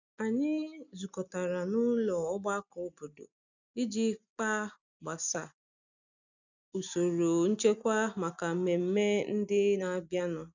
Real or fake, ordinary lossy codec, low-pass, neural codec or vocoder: real; none; 7.2 kHz; none